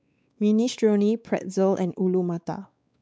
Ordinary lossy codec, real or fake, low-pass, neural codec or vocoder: none; fake; none; codec, 16 kHz, 4 kbps, X-Codec, WavLM features, trained on Multilingual LibriSpeech